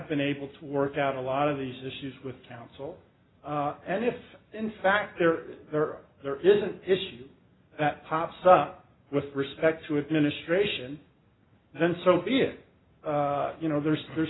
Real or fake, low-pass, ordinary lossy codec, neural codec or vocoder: real; 7.2 kHz; AAC, 16 kbps; none